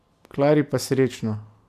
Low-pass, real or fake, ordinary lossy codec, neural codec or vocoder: 14.4 kHz; real; none; none